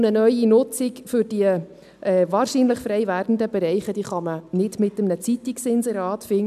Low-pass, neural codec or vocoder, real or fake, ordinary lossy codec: 14.4 kHz; none; real; none